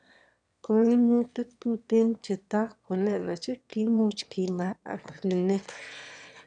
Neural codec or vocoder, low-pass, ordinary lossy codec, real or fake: autoencoder, 22.05 kHz, a latent of 192 numbers a frame, VITS, trained on one speaker; 9.9 kHz; none; fake